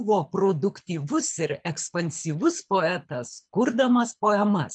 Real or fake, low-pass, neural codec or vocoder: fake; 9.9 kHz; codec, 24 kHz, 6 kbps, HILCodec